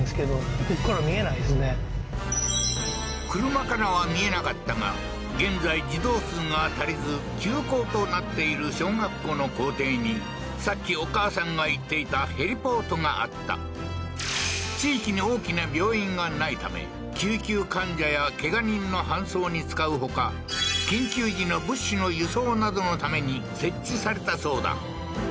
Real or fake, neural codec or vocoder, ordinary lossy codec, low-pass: real; none; none; none